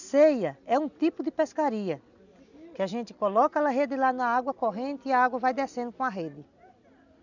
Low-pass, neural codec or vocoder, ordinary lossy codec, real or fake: 7.2 kHz; none; none; real